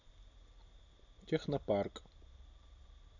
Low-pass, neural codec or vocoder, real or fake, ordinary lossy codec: 7.2 kHz; none; real; none